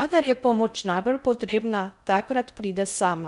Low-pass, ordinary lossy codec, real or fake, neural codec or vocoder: 10.8 kHz; none; fake; codec, 16 kHz in and 24 kHz out, 0.6 kbps, FocalCodec, streaming, 4096 codes